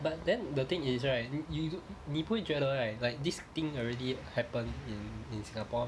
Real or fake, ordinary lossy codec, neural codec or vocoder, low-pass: real; none; none; none